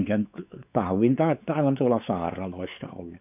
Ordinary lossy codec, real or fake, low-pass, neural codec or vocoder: none; fake; 3.6 kHz; codec, 16 kHz, 4 kbps, X-Codec, WavLM features, trained on Multilingual LibriSpeech